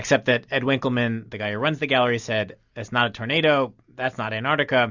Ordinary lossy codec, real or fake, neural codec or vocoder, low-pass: Opus, 64 kbps; real; none; 7.2 kHz